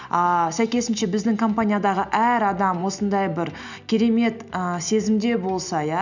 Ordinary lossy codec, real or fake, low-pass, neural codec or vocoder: none; real; 7.2 kHz; none